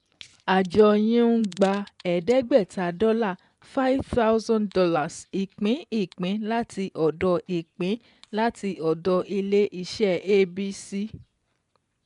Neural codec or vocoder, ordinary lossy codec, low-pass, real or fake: vocoder, 24 kHz, 100 mel bands, Vocos; none; 10.8 kHz; fake